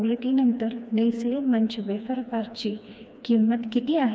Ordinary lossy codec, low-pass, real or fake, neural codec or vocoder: none; none; fake; codec, 16 kHz, 2 kbps, FreqCodec, smaller model